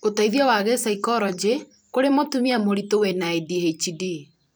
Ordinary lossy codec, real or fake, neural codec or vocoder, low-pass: none; fake; vocoder, 44.1 kHz, 128 mel bands every 256 samples, BigVGAN v2; none